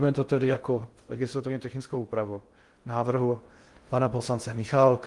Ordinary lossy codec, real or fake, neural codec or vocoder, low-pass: Opus, 24 kbps; fake; codec, 16 kHz in and 24 kHz out, 0.6 kbps, FocalCodec, streaming, 2048 codes; 10.8 kHz